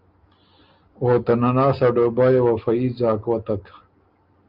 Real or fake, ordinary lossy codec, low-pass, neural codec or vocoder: real; Opus, 24 kbps; 5.4 kHz; none